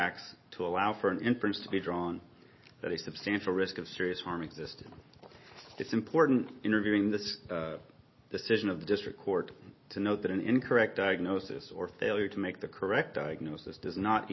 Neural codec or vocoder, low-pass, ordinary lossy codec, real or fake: none; 7.2 kHz; MP3, 24 kbps; real